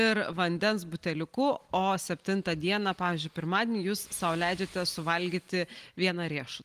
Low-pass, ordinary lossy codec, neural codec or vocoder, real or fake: 14.4 kHz; Opus, 24 kbps; none; real